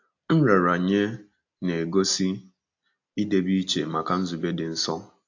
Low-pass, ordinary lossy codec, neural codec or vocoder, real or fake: 7.2 kHz; AAC, 48 kbps; none; real